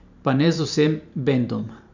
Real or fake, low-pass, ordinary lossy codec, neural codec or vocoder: fake; 7.2 kHz; none; vocoder, 44.1 kHz, 128 mel bands every 512 samples, BigVGAN v2